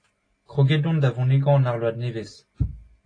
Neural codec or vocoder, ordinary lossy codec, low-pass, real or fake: none; AAC, 32 kbps; 9.9 kHz; real